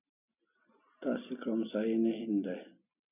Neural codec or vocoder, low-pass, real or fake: none; 3.6 kHz; real